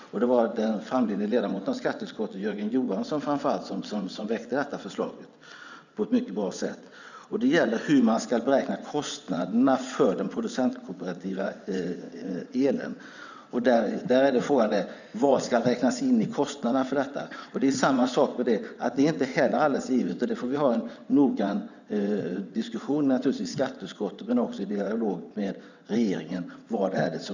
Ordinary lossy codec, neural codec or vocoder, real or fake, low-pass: none; vocoder, 22.05 kHz, 80 mel bands, WaveNeXt; fake; 7.2 kHz